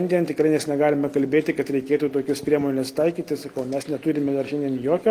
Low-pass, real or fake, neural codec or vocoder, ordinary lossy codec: 14.4 kHz; real; none; Opus, 24 kbps